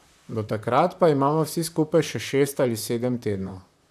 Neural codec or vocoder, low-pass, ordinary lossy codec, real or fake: vocoder, 44.1 kHz, 128 mel bands, Pupu-Vocoder; 14.4 kHz; none; fake